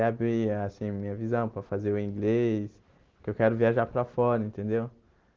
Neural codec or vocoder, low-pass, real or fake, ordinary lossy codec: none; 7.2 kHz; real; Opus, 16 kbps